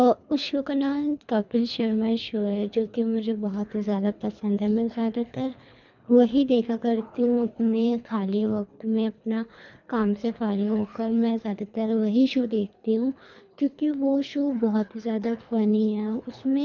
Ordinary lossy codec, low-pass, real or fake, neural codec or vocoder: none; 7.2 kHz; fake; codec, 24 kHz, 3 kbps, HILCodec